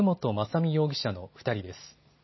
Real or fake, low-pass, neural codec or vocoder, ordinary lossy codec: real; 7.2 kHz; none; MP3, 24 kbps